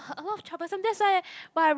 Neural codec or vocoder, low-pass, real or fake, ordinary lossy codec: none; none; real; none